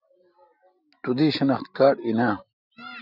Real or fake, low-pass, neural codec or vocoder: real; 5.4 kHz; none